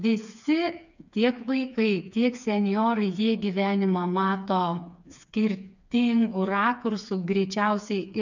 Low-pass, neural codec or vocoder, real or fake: 7.2 kHz; codec, 16 kHz, 4 kbps, FreqCodec, smaller model; fake